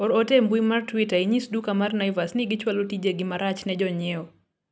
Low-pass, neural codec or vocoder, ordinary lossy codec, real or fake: none; none; none; real